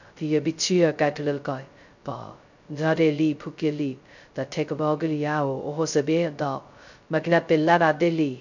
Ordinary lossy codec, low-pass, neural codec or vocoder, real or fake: none; 7.2 kHz; codec, 16 kHz, 0.2 kbps, FocalCodec; fake